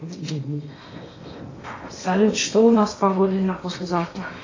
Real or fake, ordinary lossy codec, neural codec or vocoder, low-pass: fake; AAC, 32 kbps; codec, 16 kHz in and 24 kHz out, 0.8 kbps, FocalCodec, streaming, 65536 codes; 7.2 kHz